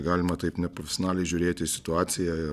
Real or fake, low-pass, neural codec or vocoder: real; 14.4 kHz; none